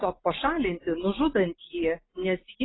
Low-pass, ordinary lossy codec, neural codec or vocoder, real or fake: 7.2 kHz; AAC, 16 kbps; none; real